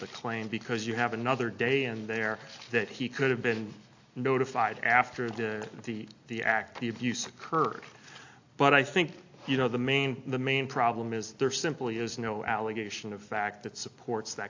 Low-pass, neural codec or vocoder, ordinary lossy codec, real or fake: 7.2 kHz; none; AAC, 48 kbps; real